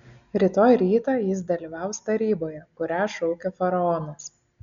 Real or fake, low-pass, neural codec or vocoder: real; 7.2 kHz; none